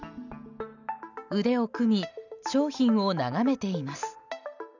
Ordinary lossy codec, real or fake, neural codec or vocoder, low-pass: none; real; none; 7.2 kHz